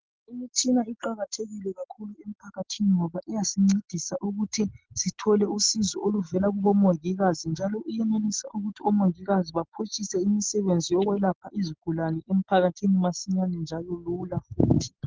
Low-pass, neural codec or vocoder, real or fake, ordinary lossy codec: 7.2 kHz; none; real; Opus, 16 kbps